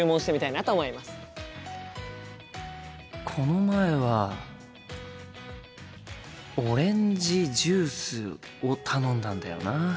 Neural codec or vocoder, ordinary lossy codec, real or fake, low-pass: none; none; real; none